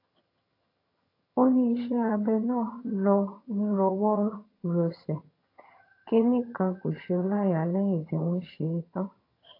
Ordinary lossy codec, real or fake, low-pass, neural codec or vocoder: AAC, 32 kbps; fake; 5.4 kHz; vocoder, 22.05 kHz, 80 mel bands, HiFi-GAN